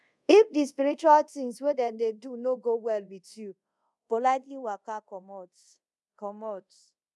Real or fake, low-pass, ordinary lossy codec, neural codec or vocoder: fake; none; none; codec, 24 kHz, 0.5 kbps, DualCodec